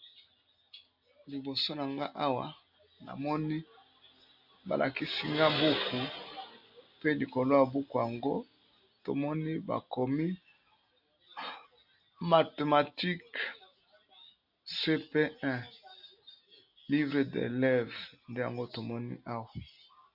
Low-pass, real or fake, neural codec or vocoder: 5.4 kHz; real; none